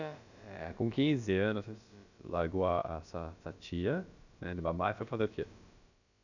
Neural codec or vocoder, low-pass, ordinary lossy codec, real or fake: codec, 16 kHz, about 1 kbps, DyCAST, with the encoder's durations; 7.2 kHz; none; fake